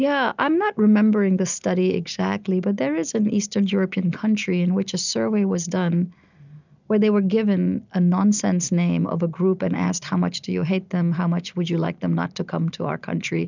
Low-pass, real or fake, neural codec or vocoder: 7.2 kHz; real; none